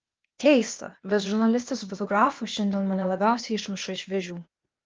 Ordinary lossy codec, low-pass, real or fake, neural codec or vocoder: Opus, 24 kbps; 7.2 kHz; fake; codec, 16 kHz, 0.8 kbps, ZipCodec